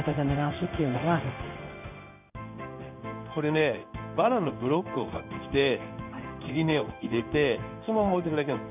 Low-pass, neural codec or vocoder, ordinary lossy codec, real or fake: 3.6 kHz; codec, 16 kHz in and 24 kHz out, 1 kbps, XY-Tokenizer; none; fake